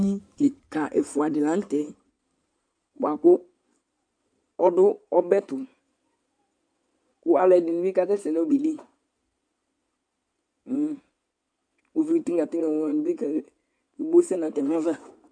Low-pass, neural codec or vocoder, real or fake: 9.9 kHz; codec, 16 kHz in and 24 kHz out, 2.2 kbps, FireRedTTS-2 codec; fake